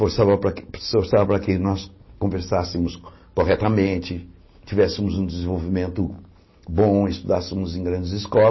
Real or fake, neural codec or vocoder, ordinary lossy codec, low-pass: real; none; MP3, 24 kbps; 7.2 kHz